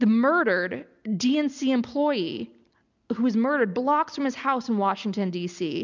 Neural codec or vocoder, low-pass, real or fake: none; 7.2 kHz; real